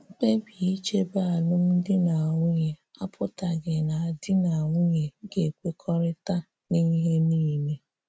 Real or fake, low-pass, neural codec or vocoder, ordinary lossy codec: real; none; none; none